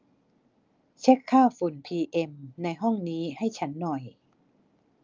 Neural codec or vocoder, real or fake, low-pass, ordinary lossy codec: none; real; 7.2 kHz; Opus, 24 kbps